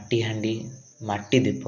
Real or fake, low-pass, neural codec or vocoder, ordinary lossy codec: real; 7.2 kHz; none; none